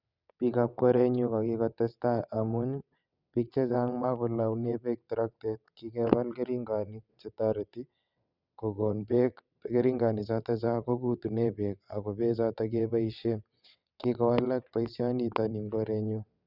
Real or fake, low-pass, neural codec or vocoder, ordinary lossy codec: fake; 5.4 kHz; vocoder, 22.05 kHz, 80 mel bands, WaveNeXt; none